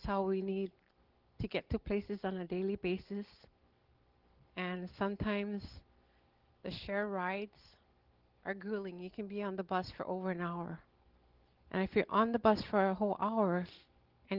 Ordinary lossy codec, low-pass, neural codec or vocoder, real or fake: Opus, 16 kbps; 5.4 kHz; none; real